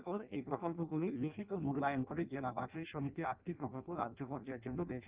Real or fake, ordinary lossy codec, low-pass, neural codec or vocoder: fake; Opus, 32 kbps; 3.6 kHz; codec, 16 kHz in and 24 kHz out, 0.6 kbps, FireRedTTS-2 codec